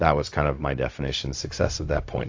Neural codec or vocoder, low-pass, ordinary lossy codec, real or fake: codec, 16 kHz, 0.4 kbps, LongCat-Audio-Codec; 7.2 kHz; AAC, 48 kbps; fake